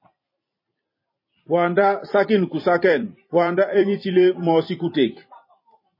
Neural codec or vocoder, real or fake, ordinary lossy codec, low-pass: vocoder, 44.1 kHz, 128 mel bands every 256 samples, BigVGAN v2; fake; MP3, 24 kbps; 5.4 kHz